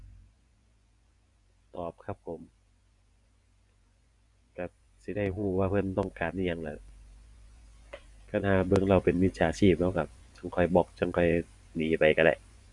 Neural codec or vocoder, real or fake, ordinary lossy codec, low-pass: vocoder, 24 kHz, 100 mel bands, Vocos; fake; none; 10.8 kHz